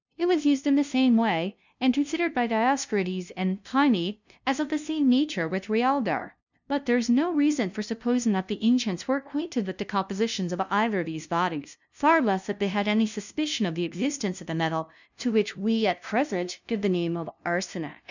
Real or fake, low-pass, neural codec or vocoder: fake; 7.2 kHz; codec, 16 kHz, 0.5 kbps, FunCodec, trained on LibriTTS, 25 frames a second